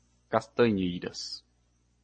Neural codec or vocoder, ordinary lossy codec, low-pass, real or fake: none; MP3, 32 kbps; 9.9 kHz; real